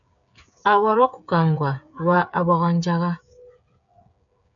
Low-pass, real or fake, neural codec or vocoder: 7.2 kHz; fake; codec, 16 kHz, 8 kbps, FreqCodec, smaller model